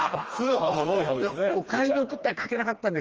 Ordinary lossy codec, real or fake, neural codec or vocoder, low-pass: Opus, 24 kbps; fake; codec, 16 kHz, 2 kbps, FreqCodec, smaller model; 7.2 kHz